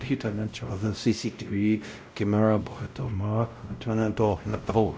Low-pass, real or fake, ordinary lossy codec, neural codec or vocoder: none; fake; none; codec, 16 kHz, 0.5 kbps, X-Codec, WavLM features, trained on Multilingual LibriSpeech